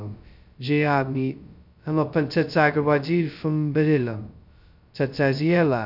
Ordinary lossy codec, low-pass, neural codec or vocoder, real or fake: none; 5.4 kHz; codec, 16 kHz, 0.2 kbps, FocalCodec; fake